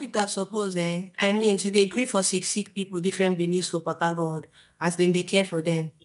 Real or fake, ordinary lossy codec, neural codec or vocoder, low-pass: fake; none; codec, 24 kHz, 0.9 kbps, WavTokenizer, medium music audio release; 10.8 kHz